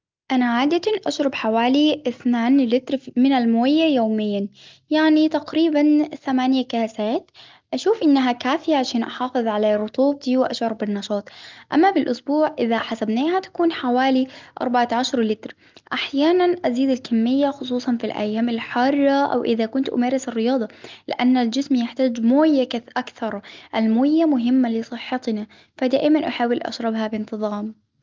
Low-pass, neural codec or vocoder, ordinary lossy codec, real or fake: 7.2 kHz; none; Opus, 24 kbps; real